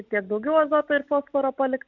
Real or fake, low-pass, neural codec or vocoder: real; 7.2 kHz; none